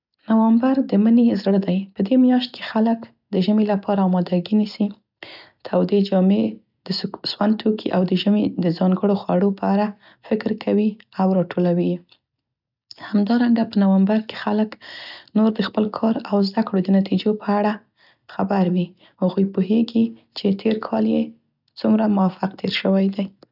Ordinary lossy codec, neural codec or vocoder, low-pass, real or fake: none; none; 5.4 kHz; real